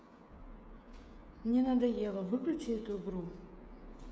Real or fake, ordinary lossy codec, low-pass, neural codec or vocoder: fake; none; none; codec, 16 kHz, 16 kbps, FreqCodec, smaller model